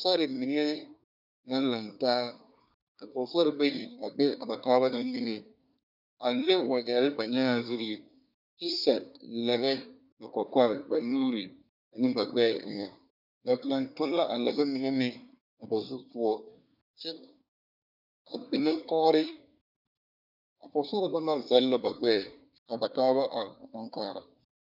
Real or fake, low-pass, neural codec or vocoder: fake; 5.4 kHz; codec, 24 kHz, 1 kbps, SNAC